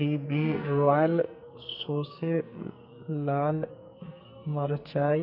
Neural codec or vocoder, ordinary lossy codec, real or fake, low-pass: codec, 44.1 kHz, 2.6 kbps, SNAC; none; fake; 5.4 kHz